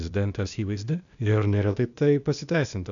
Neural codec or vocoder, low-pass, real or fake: codec, 16 kHz, 0.8 kbps, ZipCodec; 7.2 kHz; fake